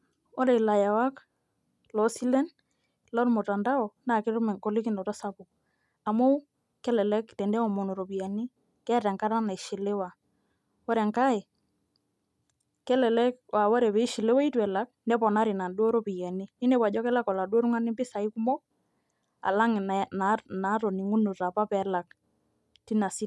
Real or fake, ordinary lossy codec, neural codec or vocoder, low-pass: real; none; none; none